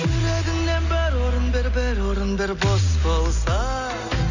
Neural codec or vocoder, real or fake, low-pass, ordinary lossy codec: none; real; 7.2 kHz; none